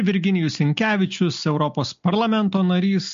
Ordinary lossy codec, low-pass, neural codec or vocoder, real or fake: MP3, 48 kbps; 7.2 kHz; none; real